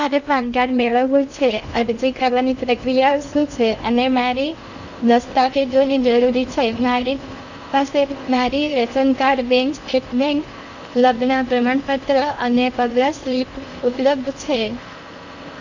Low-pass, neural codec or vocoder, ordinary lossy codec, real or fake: 7.2 kHz; codec, 16 kHz in and 24 kHz out, 0.6 kbps, FocalCodec, streaming, 2048 codes; none; fake